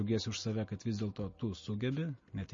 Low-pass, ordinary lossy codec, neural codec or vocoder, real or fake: 7.2 kHz; MP3, 32 kbps; none; real